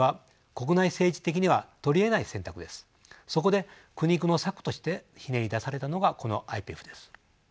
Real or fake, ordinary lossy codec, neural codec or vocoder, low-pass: real; none; none; none